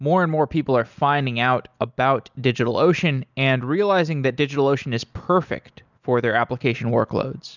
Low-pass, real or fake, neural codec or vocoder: 7.2 kHz; real; none